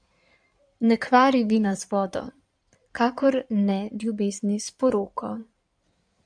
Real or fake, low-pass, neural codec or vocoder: fake; 9.9 kHz; codec, 16 kHz in and 24 kHz out, 2.2 kbps, FireRedTTS-2 codec